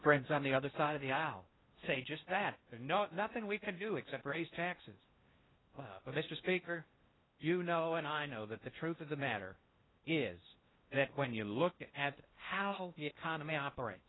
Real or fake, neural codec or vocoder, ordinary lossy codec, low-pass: fake; codec, 16 kHz in and 24 kHz out, 0.6 kbps, FocalCodec, streaming, 4096 codes; AAC, 16 kbps; 7.2 kHz